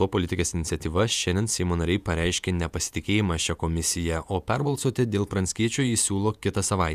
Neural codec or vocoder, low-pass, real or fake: vocoder, 48 kHz, 128 mel bands, Vocos; 14.4 kHz; fake